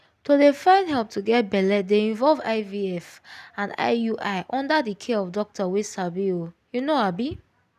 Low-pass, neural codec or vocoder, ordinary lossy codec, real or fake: 14.4 kHz; none; none; real